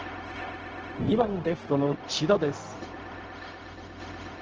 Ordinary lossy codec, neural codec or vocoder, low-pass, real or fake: Opus, 16 kbps; codec, 16 kHz, 0.4 kbps, LongCat-Audio-Codec; 7.2 kHz; fake